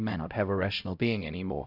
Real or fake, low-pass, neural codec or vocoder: fake; 5.4 kHz; codec, 16 kHz, 0.5 kbps, X-Codec, HuBERT features, trained on LibriSpeech